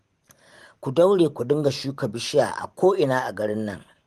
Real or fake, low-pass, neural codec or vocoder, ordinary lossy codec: fake; 14.4 kHz; vocoder, 44.1 kHz, 128 mel bands every 512 samples, BigVGAN v2; Opus, 24 kbps